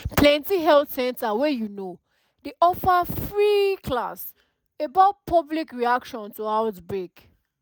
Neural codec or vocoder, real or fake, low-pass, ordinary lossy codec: none; real; none; none